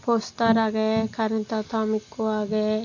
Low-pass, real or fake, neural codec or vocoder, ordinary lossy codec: 7.2 kHz; real; none; AAC, 48 kbps